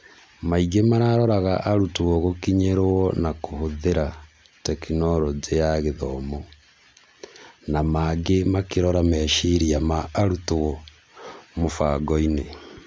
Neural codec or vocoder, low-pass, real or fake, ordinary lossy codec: none; none; real; none